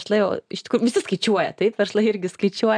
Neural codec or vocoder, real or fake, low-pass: none; real; 9.9 kHz